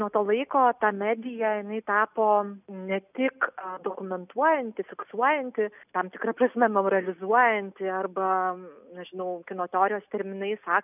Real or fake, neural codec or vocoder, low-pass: real; none; 3.6 kHz